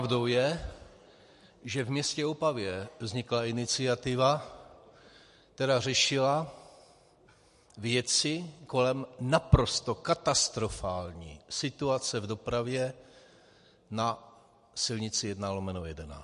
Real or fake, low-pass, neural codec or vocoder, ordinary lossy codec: real; 14.4 kHz; none; MP3, 48 kbps